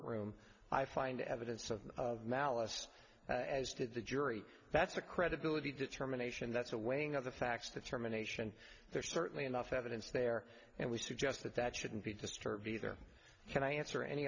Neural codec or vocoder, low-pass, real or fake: none; 7.2 kHz; real